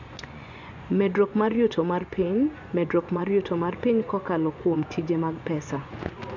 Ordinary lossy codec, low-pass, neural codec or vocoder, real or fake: none; 7.2 kHz; vocoder, 24 kHz, 100 mel bands, Vocos; fake